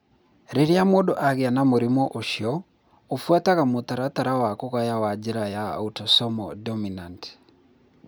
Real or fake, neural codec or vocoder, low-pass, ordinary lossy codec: real; none; none; none